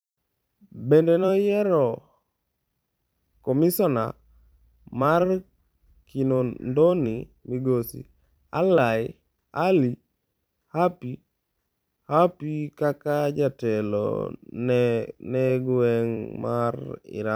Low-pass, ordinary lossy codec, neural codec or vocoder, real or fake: none; none; vocoder, 44.1 kHz, 128 mel bands every 256 samples, BigVGAN v2; fake